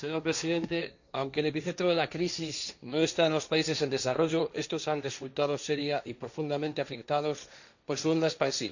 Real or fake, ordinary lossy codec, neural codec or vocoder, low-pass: fake; none; codec, 16 kHz, 1.1 kbps, Voila-Tokenizer; 7.2 kHz